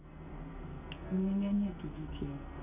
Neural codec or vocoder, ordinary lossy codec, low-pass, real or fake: codec, 44.1 kHz, 2.6 kbps, SNAC; none; 3.6 kHz; fake